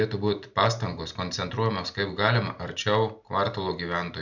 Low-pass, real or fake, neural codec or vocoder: 7.2 kHz; real; none